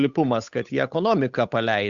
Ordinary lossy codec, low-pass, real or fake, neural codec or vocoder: Opus, 64 kbps; 7.2 kHz; fake; codec, 16 kHz, 8 kbps, FunCodec, trained on Chinese and English, 25 frames a second